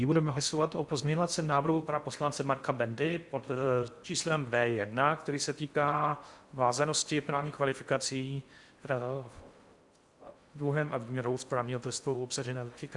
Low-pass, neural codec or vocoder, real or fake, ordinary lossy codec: 10.8 kHz; codec, 16 kHz in and 24 kHz out, 0.6 kbps, FocalCodec, streaming, 4096 codes; fake; Opus, 64 kbps